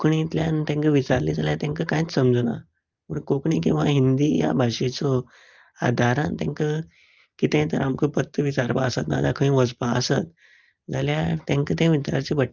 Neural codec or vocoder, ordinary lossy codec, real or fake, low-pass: none; Opus, 24 kbps; real; 7.2 kHz